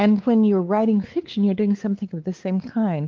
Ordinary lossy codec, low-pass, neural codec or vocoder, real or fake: Opus, 16 kbps; 7.2 kHz; codec, 16 kHz, 4 kbps, X-Codec, WavLM features, trained on Multilingual LibriSpeech; fake